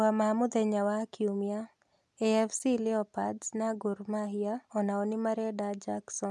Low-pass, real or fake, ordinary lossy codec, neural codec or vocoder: none; real; none; none